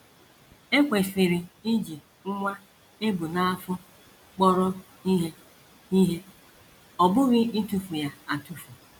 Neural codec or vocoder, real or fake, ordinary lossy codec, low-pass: none; real; MP3, 96 kbps; 19.8 kHz